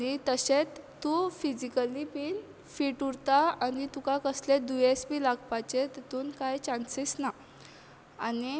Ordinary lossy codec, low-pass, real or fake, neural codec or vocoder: none; none; real; none